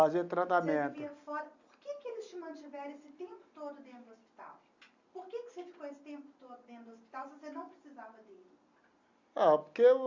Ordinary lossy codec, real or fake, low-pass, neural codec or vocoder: Opus, 64 kbps; real; 7.2 kHz; none